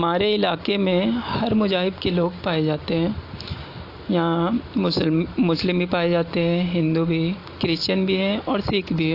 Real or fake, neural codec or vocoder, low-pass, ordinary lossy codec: real; none; 5.4 kHz; AAC, 32 kbps